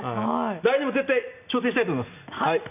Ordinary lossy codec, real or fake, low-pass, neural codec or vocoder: none; real; 3.6 kHz; none